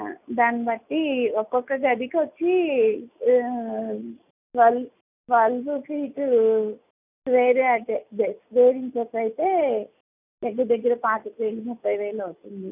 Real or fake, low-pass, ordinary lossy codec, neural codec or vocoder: real; 3.6 kHz; none; none